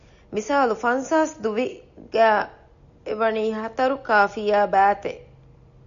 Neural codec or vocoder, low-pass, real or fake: none; 7.2 kHz; real